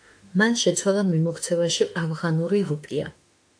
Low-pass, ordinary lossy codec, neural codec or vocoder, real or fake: 9.9 kHz; AAC, 64 kbps; autoencoder, 48 kHz, 32 numbers a frame, DAC-VAE, trained on Japanese speech; fake